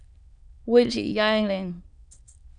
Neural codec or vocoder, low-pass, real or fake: autoencoder, 22.05 kHz, a latent of 192 numbers a frame, VITS, trained on many speakers; 9.9 kHz; fake